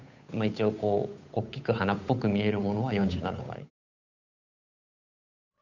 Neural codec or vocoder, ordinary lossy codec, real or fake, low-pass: codec, 16 kHz, 8 kbps, FunCodec, trained on Chinese and English, 25 frames a second; none; fake; 7.2 kHz